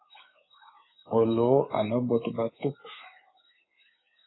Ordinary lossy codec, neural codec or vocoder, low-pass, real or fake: AAC, 16 kbps; codec, 24 kHz, 3.1 kbps, DualCodec; 7.2 kHz; fake